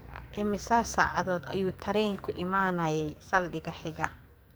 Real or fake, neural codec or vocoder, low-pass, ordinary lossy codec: fake; codec, 44.1 kHz, 2.6 kbps, SNAC; none; none